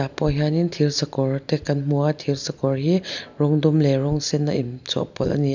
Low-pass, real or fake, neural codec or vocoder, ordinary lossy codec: 7.2 kHz; real; none; none